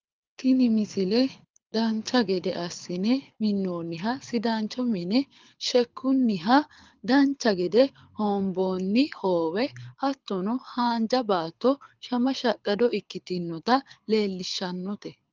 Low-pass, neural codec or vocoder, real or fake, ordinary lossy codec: 7.2 kHz; codec, 24 kHz, 6 kbps, HILCodec; fake; Opus, 24 kbps